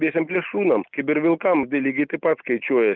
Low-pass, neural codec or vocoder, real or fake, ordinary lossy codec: 7.2 kHz; none; real; Opus, 24 kbps